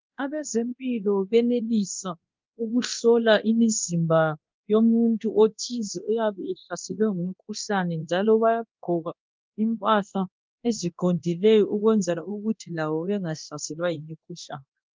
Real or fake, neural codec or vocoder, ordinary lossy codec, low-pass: fake; codec, 24 kHz, 0.9 kbps, DualCodec; Opus, 32 kbps; 7.2 kHz